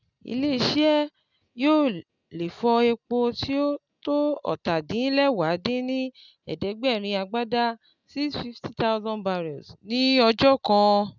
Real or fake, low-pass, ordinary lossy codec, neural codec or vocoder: real; 7.2 kHz; none; none